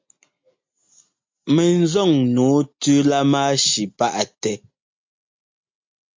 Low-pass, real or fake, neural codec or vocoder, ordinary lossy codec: 7.2 kHz; real; none; MP3, 48 kbps